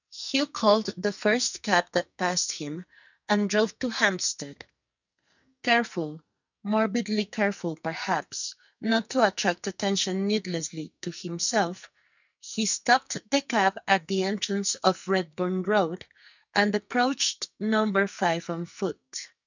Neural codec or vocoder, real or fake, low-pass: codec, 44.1 kHz, 2.6 kbps, SNAC; fake; 7.2 kHz